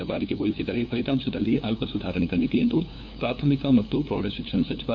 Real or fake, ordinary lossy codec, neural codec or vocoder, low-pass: fake; none; codec, 16 kHz, 2 kbps, FunCodec, trained on LibriTTS, 25 frames a second; 7.2 kHz